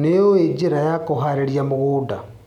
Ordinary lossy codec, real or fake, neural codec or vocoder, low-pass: none; real; none; 19.8 kHz